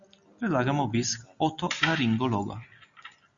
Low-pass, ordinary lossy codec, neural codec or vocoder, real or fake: 7.2 kHz; Opus, 64 kbps; none; real